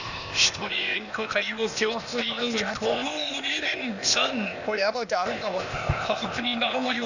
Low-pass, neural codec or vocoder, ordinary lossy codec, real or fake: 7.2 kHz; codec, 16 kHz, 0.8 kbps, ZipCodec; none; fake